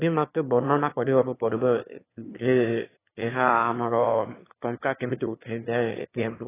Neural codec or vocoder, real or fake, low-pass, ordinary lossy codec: autoencoder, 22.05 kHz, a latent of 192 numbers a frame, VITS, trained on one speaker; fake; 3.6 kHz; AAC, 16 kbps